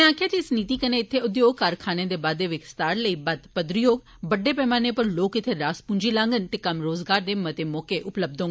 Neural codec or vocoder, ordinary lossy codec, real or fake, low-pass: none; none; real; none